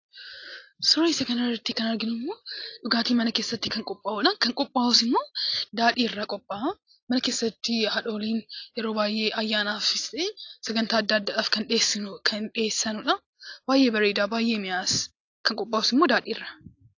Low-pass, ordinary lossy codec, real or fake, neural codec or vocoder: 7.2 kHz; AAC, 48 kbps; real; none